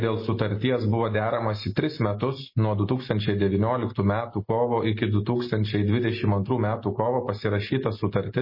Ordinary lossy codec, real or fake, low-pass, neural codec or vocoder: MP3, 24 kbps; real; 5.4 kHz; none